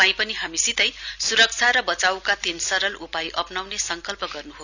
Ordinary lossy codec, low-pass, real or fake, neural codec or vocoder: none; 7.2 kHz; real; none